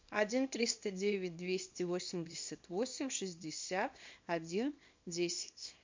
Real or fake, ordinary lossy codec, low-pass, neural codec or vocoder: fake; MP3, 48 kbps; 7.2 kHz; codec, 24 kHz, 0.9 kbps, WavTokenizer, small release